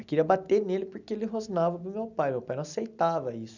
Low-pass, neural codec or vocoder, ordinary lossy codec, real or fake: 7.2 kHz; none; none; real